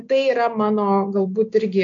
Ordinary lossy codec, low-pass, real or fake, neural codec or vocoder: MP3, 48 kbps; 7.2 kHz; real; none